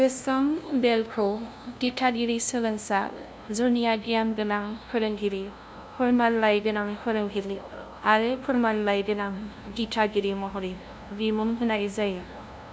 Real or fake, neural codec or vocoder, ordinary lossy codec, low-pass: fake; codec, 16 kHz, 0.5 kbps, FunCodec, trained on LibriTTS, 25 frames a second; none; none